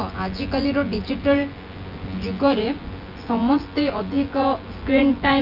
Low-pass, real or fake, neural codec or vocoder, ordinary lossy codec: 5.4 kHz; fake; vocoder, 24 kHz, 100 mel bands, Vocos; Opus, 24 kbps